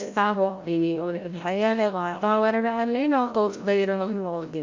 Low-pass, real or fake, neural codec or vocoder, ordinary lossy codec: 7.2 kHz; fake; codec, 16 kHz, 0.5 kbps, FreqCodec, larger model; MP3, 48 kbps